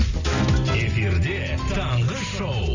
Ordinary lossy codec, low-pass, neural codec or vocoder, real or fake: Opus, 64 kbps; 7.2 kHz; none; real